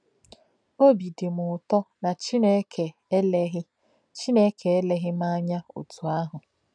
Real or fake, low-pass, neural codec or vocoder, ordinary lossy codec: real; 9.9 kHz; none; none